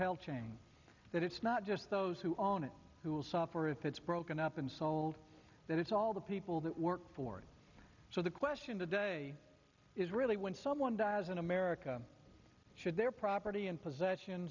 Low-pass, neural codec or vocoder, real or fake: 7.2 kHz; none; real